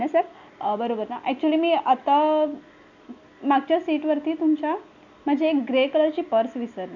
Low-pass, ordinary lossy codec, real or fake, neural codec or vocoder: 7.2 kHz; none; real; none